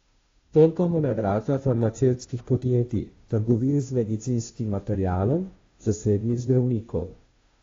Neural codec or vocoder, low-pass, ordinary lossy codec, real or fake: codec, 16 kHz, 0.5 kbps, FunCodec, trained on Chinese and English, 25 frames a second; 7.2 kHz; AAC, 32 kbps; fake